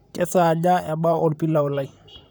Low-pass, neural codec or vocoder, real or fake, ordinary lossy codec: none; vocoder, 44.1 kHz, 128 mel bands, Pupu-Vocoder; fake; none